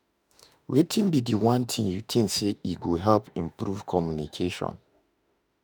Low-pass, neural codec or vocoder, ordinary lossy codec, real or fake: none; autoencoder, 48 kHz, 32 numbers a frame, DAC-VAE, trained on Japanese speech; none; fake